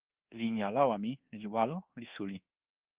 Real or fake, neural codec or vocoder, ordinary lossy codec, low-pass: fake; codec, 24 kHz, 1.2 kbps, DualCodec; Opus, 16 kbps; 3.6 kHz